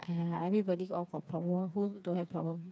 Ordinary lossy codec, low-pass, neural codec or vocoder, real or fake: none; none; codec, 16 kHz, 4 kbps, FreqCodec, smaller model; fake